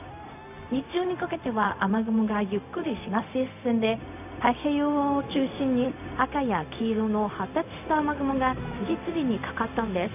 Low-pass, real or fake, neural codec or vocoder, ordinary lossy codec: 3.6 kHz; fake; codec, 16 kHz, 0.4 kbps, LongCat-Audio-Codec; none